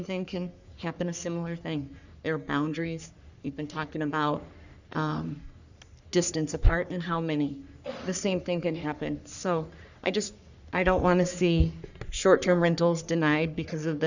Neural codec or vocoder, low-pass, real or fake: codec, 44.1 kHz, 3.4 kbps, Pupu-Codec; 7.2 kHz; fake